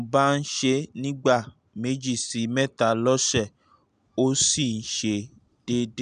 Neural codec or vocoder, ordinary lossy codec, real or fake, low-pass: none; none; real; 9.9 kHz